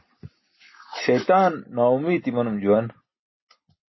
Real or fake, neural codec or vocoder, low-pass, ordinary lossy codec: real; none; 7.2 kHz; MP3, 24 kbps